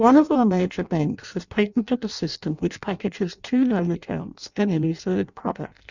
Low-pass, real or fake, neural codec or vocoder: 7.2 kHz; fake; codec, 16 kHz in and 24 kHz out, 0.6 kbps, FireRedTTS-2 codec